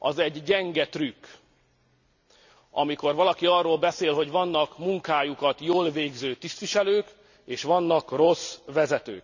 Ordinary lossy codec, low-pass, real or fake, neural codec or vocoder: none; 7.2 kHz; real; none